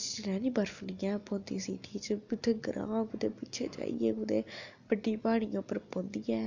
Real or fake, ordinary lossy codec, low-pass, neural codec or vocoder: real; none; 7.2 kHz; none